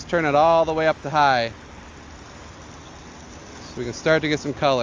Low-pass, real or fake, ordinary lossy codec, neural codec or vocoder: 7.2 kHz; real; Opus, 32 kbps; none